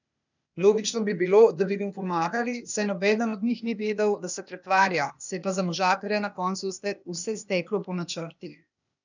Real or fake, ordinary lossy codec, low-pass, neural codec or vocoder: fake; none; 7.2 kHz; codec, 16 kHz, 0.8 kbps, ZipCodec